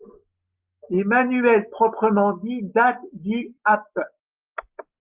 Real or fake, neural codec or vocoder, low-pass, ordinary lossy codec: real; none; 3.6 kHz; Opus, 24 kbps